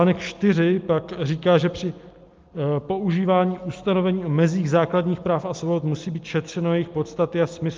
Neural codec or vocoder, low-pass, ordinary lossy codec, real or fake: none; 7.2 kHz; Opus, 24 kbps; real